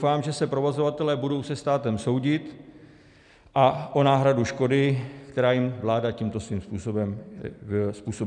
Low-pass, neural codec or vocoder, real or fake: 10.8 kHz; none; real